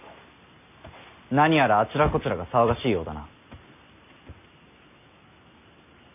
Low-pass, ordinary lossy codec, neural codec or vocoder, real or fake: 3.6 kHz; none; none; real